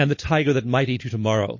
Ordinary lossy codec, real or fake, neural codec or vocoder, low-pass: MP3, 32 kbps; real; none; 7.2 kHz